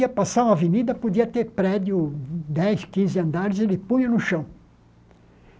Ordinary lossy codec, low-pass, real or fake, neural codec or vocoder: none; none; real; none